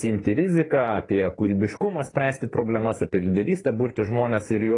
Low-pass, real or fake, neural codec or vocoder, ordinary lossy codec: 10.8 kHz; fake; codec, 44.1 kHz, 2.6 kbps, SNAC; AAC, 32 kbps